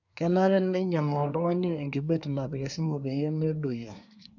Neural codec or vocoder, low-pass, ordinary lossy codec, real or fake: codec, 24 kHz, 1 kbps, SNAC; 7.2 kHz; none; fake